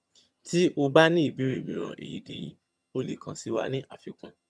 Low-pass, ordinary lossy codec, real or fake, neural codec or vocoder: none; none; fake; vocoder, 22.05 kHz, 80 mel bands, HiFi-GAN